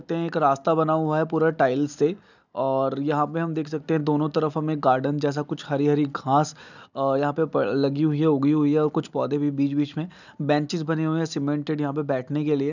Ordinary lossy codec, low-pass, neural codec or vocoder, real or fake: none; 7.2 kHz; none; real